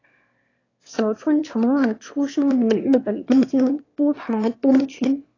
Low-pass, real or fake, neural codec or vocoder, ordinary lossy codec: 7.2 kHz; fake; autoencoder, 22.05 kHz, a latent of 192 numbers a frame, VITS, trained on one speaker; AAC, 32 kbps